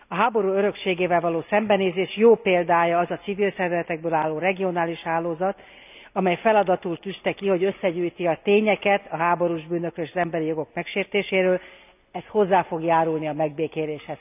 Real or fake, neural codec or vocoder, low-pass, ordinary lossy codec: real; none; 3.6 kHz; none